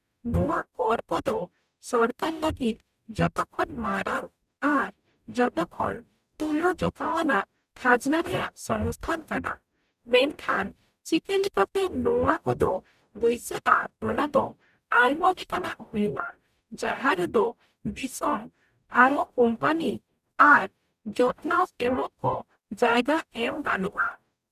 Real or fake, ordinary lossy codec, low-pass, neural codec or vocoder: fake; none; 14.4 kHz; codec, 44.1 kHz, 0.9 kbps, DAC